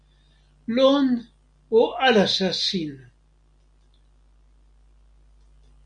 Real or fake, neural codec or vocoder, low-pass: real; none; 9.9 kHz